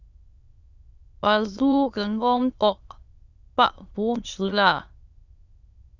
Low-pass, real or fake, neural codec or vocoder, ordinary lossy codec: 7.2 kHz; fake; autoencoder, 22.05 kHz, a latent of 192 numbers a frame, VITS, trained on many speakers; Opus, 64 kbps